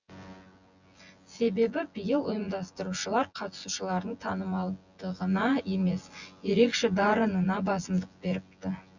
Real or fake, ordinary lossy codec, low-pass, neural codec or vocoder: fake; Opus, 64 kbps; 7.2 kHz; vocoder, 24 kHz, 100 mel bands, Vocos